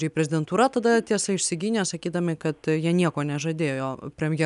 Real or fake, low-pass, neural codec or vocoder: real; 10.8 kHz; none